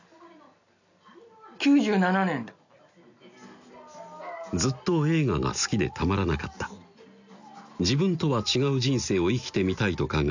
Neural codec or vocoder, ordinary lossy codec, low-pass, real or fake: none; none; 7.2 kHz; real